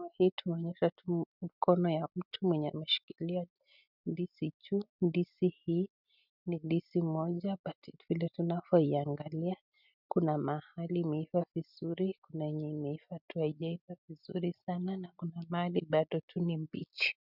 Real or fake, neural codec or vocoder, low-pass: real; none; 5.4 kHz